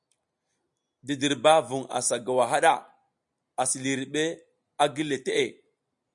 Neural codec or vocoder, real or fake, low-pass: none; real; 10.8 kHz